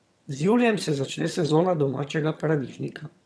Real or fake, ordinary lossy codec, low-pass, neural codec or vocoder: fake; none; none; vocoder, 22.05 kHz, 80 mel bands, HiFi-GAN